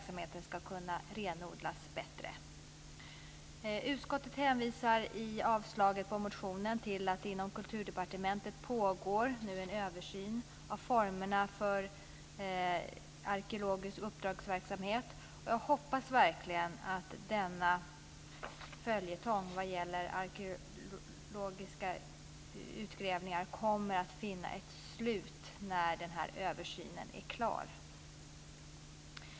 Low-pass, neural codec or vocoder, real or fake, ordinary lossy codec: none; none; real; none